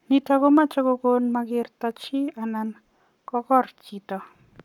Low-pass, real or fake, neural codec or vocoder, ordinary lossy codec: 19.8 kHz; fake; codec, 44.1 kHz, 7.8 kbps, Pupu-Codec; none